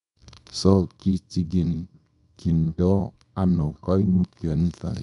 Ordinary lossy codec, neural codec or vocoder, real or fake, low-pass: none; codec, 24 kHz, 0.9 kbps, WavTokenizer, small release; fake; 10.8 kHz